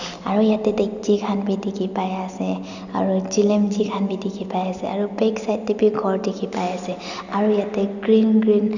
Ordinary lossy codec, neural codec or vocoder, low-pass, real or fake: none; none; 7.2 kHz; real